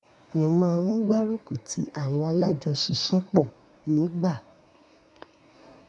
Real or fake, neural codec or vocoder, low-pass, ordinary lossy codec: fake; codec, 24 kHz, 1 kbps, SNAC; 10.8 kHz; none